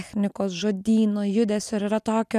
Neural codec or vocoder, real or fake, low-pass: none; real; 14.4 kHz